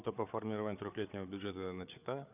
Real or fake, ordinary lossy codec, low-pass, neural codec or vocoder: fake; none; 3.6 kHz; codec, 16 kHz, 8 kbps, FreqCodec, larger model